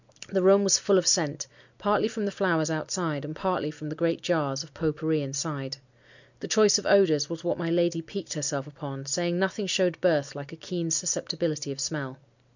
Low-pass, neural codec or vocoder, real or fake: 7.2 kHz; none; real